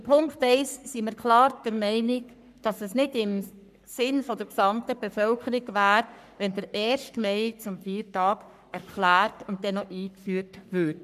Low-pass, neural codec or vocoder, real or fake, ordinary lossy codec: 14.4 kHz; codec, 44.1 kHz, 3.4 kbps, Pupu-Codec; fake; none